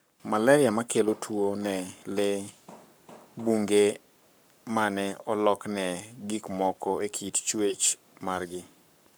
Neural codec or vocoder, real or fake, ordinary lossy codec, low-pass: codec, 44.1 kHz, 7.8 kbps, Pupu-Codec; fake; none; none